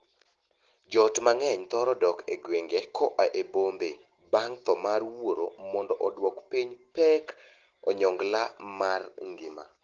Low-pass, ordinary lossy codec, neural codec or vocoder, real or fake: 7.2 kHz; Opus, 16 kbps; none; real